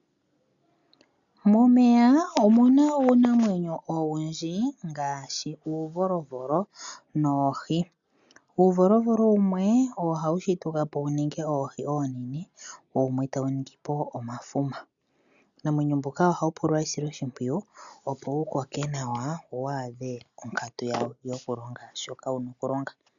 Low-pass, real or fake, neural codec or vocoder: 7.2 kHz; real; none